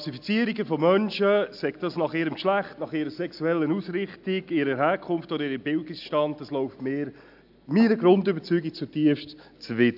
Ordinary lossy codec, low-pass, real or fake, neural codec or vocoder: AAC, 48 kbps; 5.4 kHz; real; none